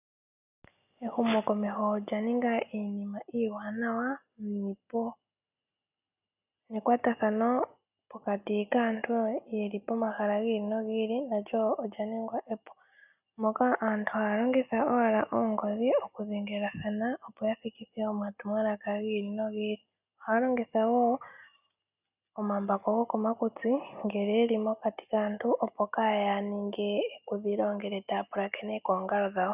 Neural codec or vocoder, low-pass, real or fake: none; 3.6 kHz; real